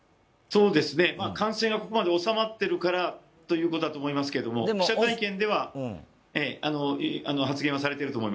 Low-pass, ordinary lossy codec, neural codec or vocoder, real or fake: none; none; none; real